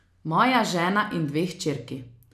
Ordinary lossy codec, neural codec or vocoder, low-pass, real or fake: none; none; 14.4 kHz; real